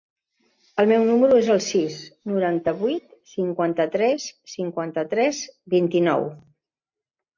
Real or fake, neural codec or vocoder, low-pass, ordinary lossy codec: real; none; 7.2 kHz; MP3, 48 kbps